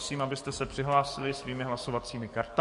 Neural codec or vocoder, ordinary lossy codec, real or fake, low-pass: codec, 44.1 kHz, 7.8 kbps, DAC; MP3, 48 kbps; fake; 14.4 kHz